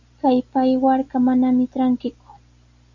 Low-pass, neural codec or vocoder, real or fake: 7.2 kHz; none; real